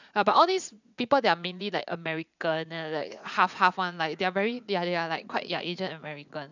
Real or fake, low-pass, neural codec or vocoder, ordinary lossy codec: fake; 7.2 kHz; vocoder, 44.1 kHz, 80 mel bands, Vocos; none